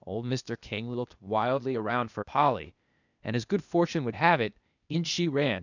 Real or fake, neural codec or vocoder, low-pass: fake; codec, 16 kHz, 0.8 kbps, ZipCodec; 7.2 kHz